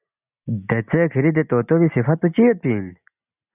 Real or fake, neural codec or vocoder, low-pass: real; none; 3.6 kHz